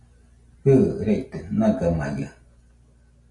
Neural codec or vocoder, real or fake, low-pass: none; real; 10.8 kHz